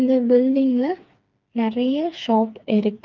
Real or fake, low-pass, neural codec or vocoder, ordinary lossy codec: fake; 7.2 kHz; codec, 16 kHz, 4 kbps, FreqCodec, smaller model; Opus, 24 kbps